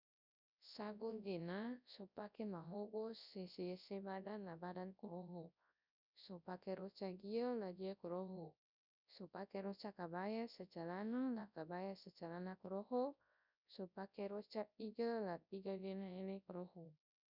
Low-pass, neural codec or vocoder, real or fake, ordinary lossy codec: 5.4 kHz; codec, 24 kHz, 0.9 kbps, WavTokenizer, large speech release; fake; none